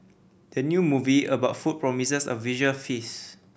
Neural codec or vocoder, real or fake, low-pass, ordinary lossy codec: none; real; none; none